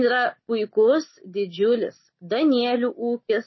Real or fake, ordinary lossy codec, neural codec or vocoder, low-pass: real; MP3, 24 kbps; none; 7.2 kHz